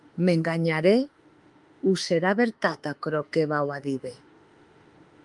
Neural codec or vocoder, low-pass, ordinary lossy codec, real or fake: autoencoder, 48 kHz, 32 numbers a frame, DAC-VAE, trained on Japanese speech; 10.8 kHz; Opus, 32 kbps; fake